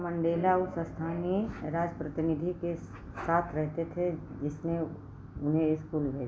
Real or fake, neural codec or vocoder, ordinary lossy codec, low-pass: real; none; none; none